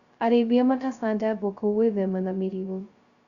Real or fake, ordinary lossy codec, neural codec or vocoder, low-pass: fake; Opus, 64 kbps; codec, 16 kHz, 0.2 kbps, FocalCodec; 7.2 kHz